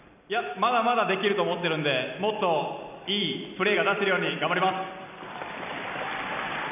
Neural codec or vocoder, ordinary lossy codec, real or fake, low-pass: vocoder, 44.1 kHz, 128 mel bands every 256 samples, BigVGAN v2; none; fake; 3.6 kHz